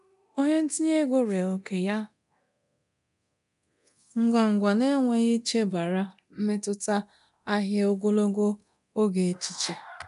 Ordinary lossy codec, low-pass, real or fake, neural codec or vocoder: none; 10.8 kHz; fake; codec, 24 kHz, 0.9 kbps, DualCodec